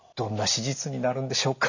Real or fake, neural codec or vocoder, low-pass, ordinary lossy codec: real; none; 7.2 kHz; none